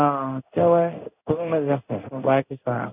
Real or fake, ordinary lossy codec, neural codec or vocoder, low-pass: fake; none; codec, 16 kHz in and 24 kHz out, 1 kbps, XY-Tokenizer; 3.6 kHz